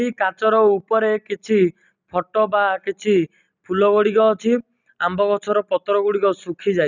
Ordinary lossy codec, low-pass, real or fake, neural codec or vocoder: none; 7.2 kHz; real; none